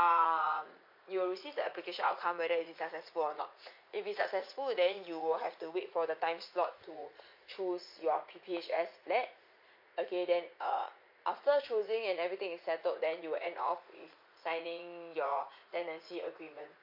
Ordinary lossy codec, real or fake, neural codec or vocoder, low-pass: MP3, 32 kbps; fake; vocoder, 44.1 kHz, 80 mel bands, Vocos; 5.4 kHz